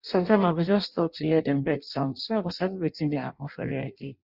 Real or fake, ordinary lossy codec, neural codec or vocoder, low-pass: fake; Opus, 64 kbps; codec, 16 kHz in and 24 kHz out, 0.6 kbps, FireRedTTS-2 codec; 5.4 kHz